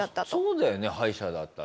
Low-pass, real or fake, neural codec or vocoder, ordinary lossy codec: none; real; none; none